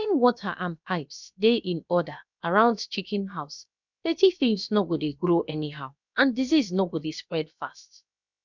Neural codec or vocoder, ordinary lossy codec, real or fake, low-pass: codec, 16 kHz, about 1 kbps, DyCAST, with the encoder's durations; none; fake; 7.2 kHz